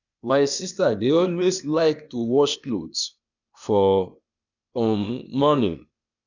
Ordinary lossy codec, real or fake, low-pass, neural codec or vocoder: none; fake; 7.2 kHz; codec, 16 kHz, 0.8 kbps, ZipCodec